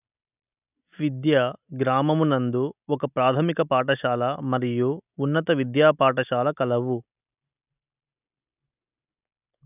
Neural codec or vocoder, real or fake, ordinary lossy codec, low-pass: none; real; none; 3.6 kHz